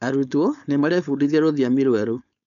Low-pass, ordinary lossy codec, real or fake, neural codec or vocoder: 7.2 kHz; none; fake; codec, 16 kHz, 4.8 kbps, FACodec